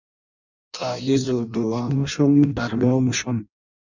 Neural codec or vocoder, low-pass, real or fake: codec, 16 kHz in and 24 kHz out, 0.6 kbps, FireRedTTS-2 codec; 7.2 kHz; fake